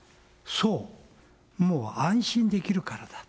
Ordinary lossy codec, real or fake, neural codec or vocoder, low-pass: none; real; none; none